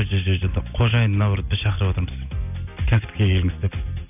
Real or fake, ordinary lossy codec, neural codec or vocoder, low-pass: real; none; none; 3.6 kHz